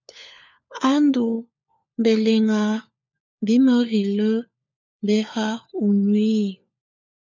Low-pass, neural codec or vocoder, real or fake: 7.2 kHz; codec, 16 kHz, 4 kbps, FunCodec, trained on LibriTTS, 50 frames a second; fake